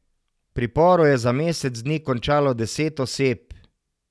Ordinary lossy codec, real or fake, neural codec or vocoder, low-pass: none; real; none; none